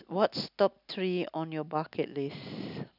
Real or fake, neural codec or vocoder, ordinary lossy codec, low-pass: real; none; none; 5.4 kHz